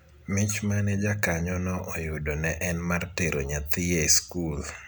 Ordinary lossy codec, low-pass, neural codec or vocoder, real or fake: none; none; none; real